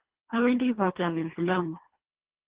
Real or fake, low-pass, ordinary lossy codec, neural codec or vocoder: fake; 3.6 kHz; Opus, 16 kbps; codec, 24 kHz, 1.5 kbps, HILCodec